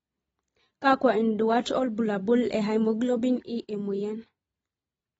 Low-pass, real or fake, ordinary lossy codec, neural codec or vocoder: 19.8 kHz; real; AAC, 24 kbps; none